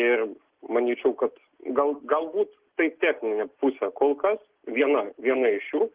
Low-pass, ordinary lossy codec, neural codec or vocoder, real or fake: 3.6 kHz; Opus, 16 kbps; none; real